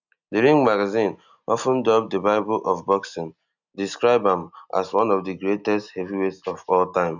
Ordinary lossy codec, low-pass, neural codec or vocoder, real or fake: none; 7.2 kHz; none; real